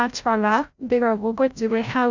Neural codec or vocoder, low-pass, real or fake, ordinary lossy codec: codec, 16 kHz, 0.5 kbps, FreqCodec, larger model; 7.2 kHz; fake; none